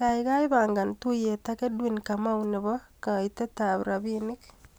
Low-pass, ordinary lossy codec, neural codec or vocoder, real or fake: none; none; none; real